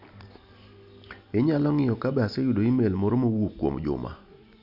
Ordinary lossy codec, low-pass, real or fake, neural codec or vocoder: none; 5.4 kHz; real; none